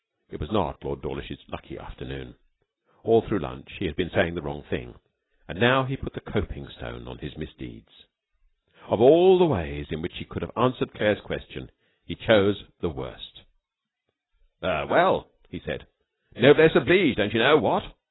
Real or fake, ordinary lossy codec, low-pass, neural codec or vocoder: real; AAC, 16 kbps; 7.2 kHz; none